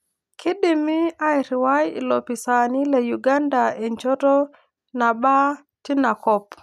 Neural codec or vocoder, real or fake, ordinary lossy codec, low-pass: none; real; none; 14.4 kHz